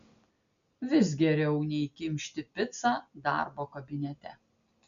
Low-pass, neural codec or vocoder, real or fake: 7.2 kHz; none; real